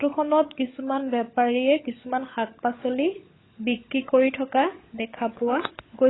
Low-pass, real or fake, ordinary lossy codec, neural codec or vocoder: 7.2 kHz; fake; AAC, 16 kbps; codec, 16 kHz, 16 kbps, FunCodec, trained on LibriTTS, 50 frames a second